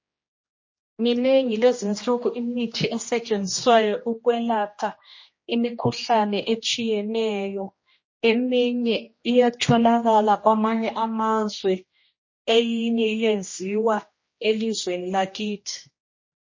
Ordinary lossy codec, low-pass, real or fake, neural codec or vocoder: MP3, 32 kbps; 7.2 kHz; fake; codec, 16 kHz, 1 kbps, X-Codec, HuBERT features, trained on general audio